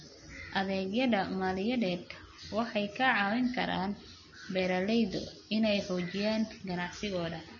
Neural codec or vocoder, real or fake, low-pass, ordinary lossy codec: none; real; 7.2 kHz; MP3, 32 kbps